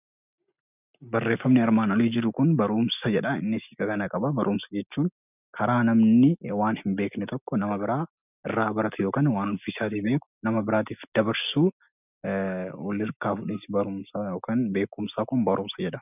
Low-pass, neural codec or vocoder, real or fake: 3.6 kHz; none; real